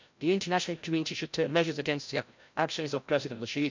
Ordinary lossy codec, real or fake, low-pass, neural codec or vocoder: MP3, 64 kbps; fake; 7.2 kHz; codec, 16 kHz, 0.5 kbps, FreqCodec, larger model